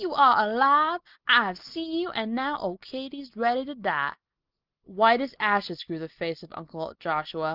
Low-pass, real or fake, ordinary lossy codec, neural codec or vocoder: 5.4 kHz; real; Opus, 32 kbps; none